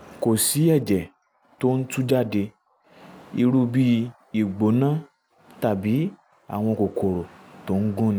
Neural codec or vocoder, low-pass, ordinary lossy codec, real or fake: none; none; none; real